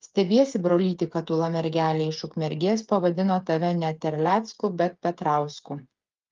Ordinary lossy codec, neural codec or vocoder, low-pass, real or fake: Opus, 32 kbps; codec, 16 kHz, 8 kbps, FreqCodec, smaller model; 7.2 kHz; fake